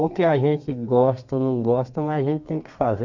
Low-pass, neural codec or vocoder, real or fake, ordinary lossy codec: 7.2 kHz; codec, 32 kHz, 1.9 kbps, SNAC; fake; none